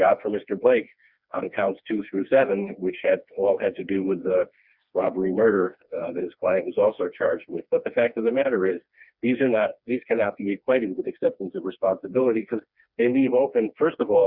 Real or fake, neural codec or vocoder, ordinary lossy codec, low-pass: fake; codec, 16 kHz, 2 kbps, FreqCodec, smaller model; Opus, 64 kbps; 5.4 kHz